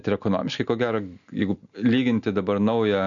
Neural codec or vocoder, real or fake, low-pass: none; real; 7.2 kHz